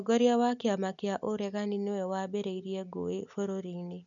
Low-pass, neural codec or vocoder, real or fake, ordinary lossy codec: 7.2 kHz; none; real; none